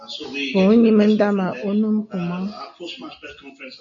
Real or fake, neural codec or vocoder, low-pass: real; none; 7.2 kHz